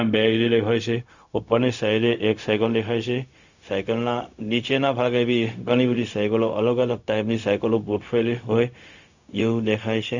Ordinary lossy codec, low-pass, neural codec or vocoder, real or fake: none; 7.2 kHz; codec, 16 kHz, 0.4 kbps, LongCat-Audio-Codec; fake